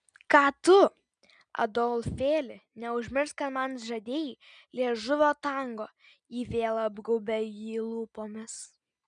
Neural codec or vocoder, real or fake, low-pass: none; real; 9.9 kHz